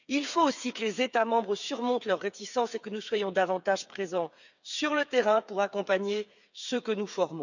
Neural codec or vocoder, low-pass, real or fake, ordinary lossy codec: codec, 16 kHz, 8 kbps, FreqCodec, smaller model; 7.2 kHz; fake; none